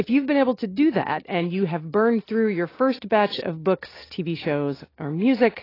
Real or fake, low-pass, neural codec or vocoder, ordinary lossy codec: real; 5.4 kHz; none; AAC, 24 kbps